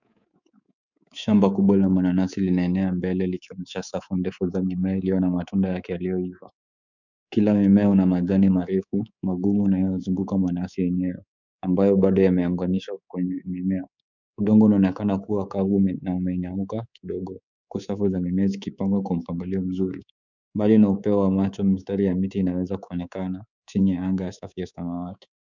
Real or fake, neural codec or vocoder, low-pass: fake; codec, 24 kHz, 3.1 kbps, DualCodec; 7.2 kHz